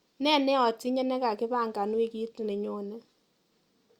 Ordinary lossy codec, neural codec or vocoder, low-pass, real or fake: Opus, 64 kbps; none; 19.8 kHz; real